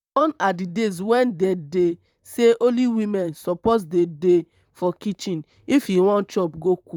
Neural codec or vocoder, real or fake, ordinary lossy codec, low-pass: vocoder, 44.1 kHz, 128 mel bands, Pupu-Vocoder; fake; none; 19.8 kHz